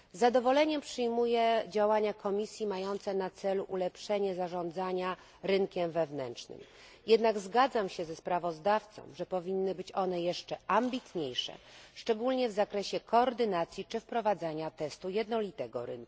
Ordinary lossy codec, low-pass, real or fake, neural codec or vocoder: none; none; real; none